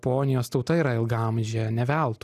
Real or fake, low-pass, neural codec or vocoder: real; 14.4 kHz; none